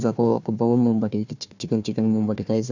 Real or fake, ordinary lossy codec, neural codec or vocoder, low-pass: fake; none; codec, 16 kHz, 1 kbps, FunCodec, trained on Chinese and English, 50 frames a second; 7.2 kHz